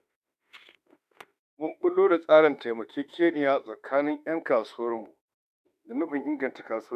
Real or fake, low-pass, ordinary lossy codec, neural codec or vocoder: fake; 14.4 kHz; none; autoencoder, 48 kHz, 32 numbers a frame, DAC-VAE, trained on Japanese speech